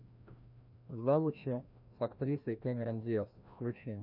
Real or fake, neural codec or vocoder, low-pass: fake; codec, 16 kHz, 1 kbps, FreqCodec, larger model; 5.4 kHz